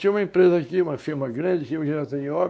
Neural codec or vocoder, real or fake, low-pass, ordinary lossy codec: codec, 16 kHz, 2 kbps, X-Codec, WavLM features, trained on Multilingual LibriSpeech; fake; none; none